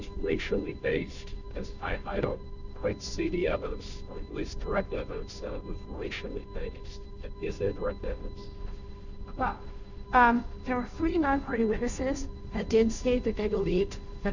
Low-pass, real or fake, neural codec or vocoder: 7.2 kHz; fake; codec, 16 kHz, 0.5 kbps, FunCodec, trained on Chinese and English, 25 frames a second